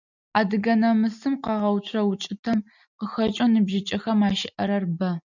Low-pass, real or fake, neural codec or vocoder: 7.2 kHz; real; none